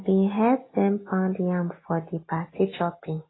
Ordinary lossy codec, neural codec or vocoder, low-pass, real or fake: AAC, 16 kbps; none; 7.2 kHz; real